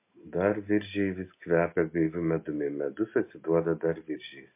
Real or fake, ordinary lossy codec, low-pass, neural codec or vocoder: real; MP3, 24 kbps; 3.6 kHz; none